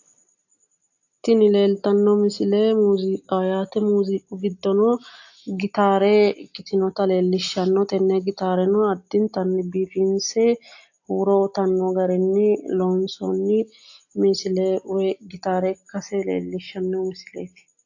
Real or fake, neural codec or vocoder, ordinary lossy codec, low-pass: real; none; AAC, 48 kbps; 7.2 kHz